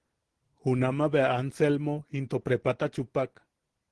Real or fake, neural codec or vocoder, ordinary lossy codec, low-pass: real; none; Opus, 16 kbps; 10.8 kHz